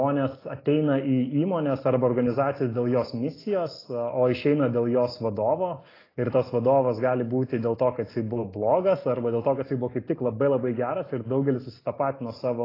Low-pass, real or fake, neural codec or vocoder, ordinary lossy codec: 5.4 kHz; real; none; AAC, 24 kbps